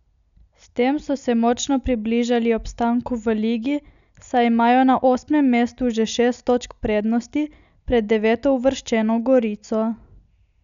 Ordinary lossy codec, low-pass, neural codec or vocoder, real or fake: none; 7.2 kHz; none; real